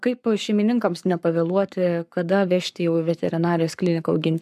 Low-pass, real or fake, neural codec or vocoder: 14.4 kHz; fake; codec, 44.1 kHz, 7.8 kbps, Pupu-Codec